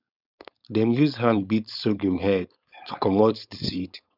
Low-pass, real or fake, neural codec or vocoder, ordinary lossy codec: 5.4 kHz; fake; codec, 16 kHz, 4.8 kbps, FACodec; none